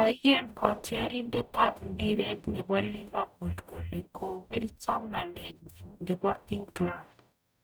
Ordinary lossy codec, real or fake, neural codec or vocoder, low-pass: none; fake; codec, 44.1 kHz, 0.9 kbps, DAC; none